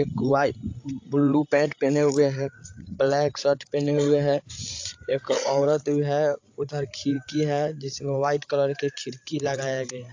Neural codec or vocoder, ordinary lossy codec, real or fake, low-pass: codec, 16 kHz, 8 kbps, FreqCodec, larger model; AAC, 48 kbps; fake; 7.2 kHz